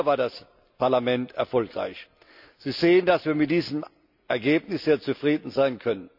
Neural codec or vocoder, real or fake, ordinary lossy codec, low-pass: none; real; none; 5.4 kHz